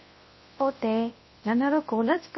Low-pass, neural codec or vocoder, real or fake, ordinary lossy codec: 7.2 kHz; codec, 24 kHz, 0.9 kbps, WavTokenizer, large speech release; fake; MP3, 24 kbps